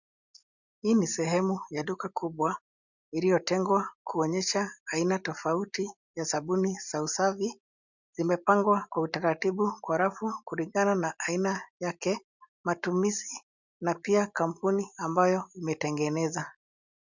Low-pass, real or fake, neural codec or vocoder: 7.2 kHz; real; none